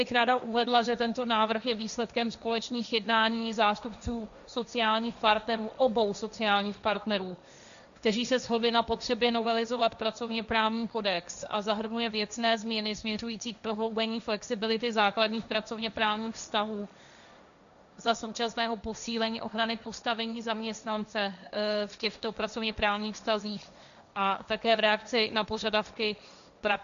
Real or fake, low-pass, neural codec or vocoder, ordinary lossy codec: fake; 7.2 kHz; codec, 16 kHz, 1.1 kbps, Voila-Tokenizer; MP3, 96 kbps